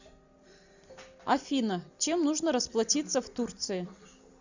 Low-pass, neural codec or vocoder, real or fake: 7.2 kHz; none; real